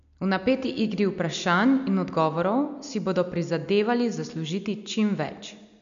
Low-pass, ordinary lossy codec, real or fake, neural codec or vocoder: 7.2 kHz; none; real; none